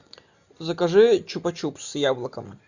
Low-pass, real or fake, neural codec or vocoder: 7.2 kHz; real; none